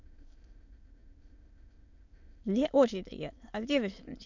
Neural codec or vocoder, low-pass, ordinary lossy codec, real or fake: autoencoder, 22.05 kHz, a latent of 192 numbers a frame, VITS, trained on many speakers; 7.2 kHz; none; fake